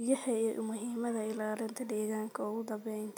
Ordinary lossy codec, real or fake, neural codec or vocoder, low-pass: none; real; none; none